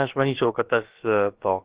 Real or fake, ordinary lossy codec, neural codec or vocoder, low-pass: fake; Opus, 16 kbps; codec, 16 kHz, about 1 kbps, DyCAST, with the encoder's durations; 3.6 kHz